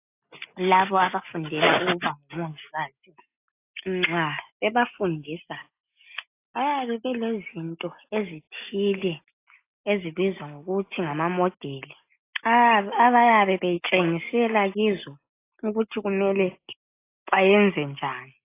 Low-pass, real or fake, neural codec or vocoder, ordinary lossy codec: 3.6 kHz; real; none; AAC, 24 kbps